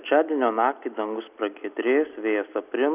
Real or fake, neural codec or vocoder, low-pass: real; none; 3.6 kHz